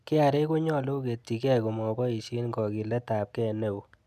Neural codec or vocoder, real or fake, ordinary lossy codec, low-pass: none; real; none; 14.4 kHz